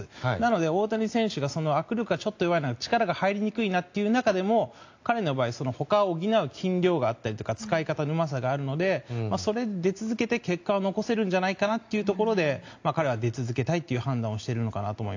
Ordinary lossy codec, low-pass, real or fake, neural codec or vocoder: AAC, 48 kbps; 7.2 kHz; real; none